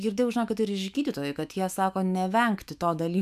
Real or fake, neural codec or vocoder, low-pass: fake; autoencoder, 48 kHz, 128 numbers a frame, DAC-VAE, trained on Japanese speech; 14.4 kHz